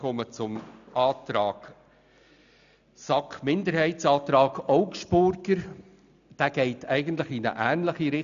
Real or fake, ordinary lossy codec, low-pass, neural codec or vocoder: real; none; 7.2 kHz; none